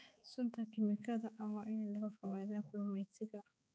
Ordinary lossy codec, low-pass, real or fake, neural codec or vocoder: none; none; fake; codec, 16 kHz, 4 kbps, X-Codec, HuBERT features, trained on balanced general audio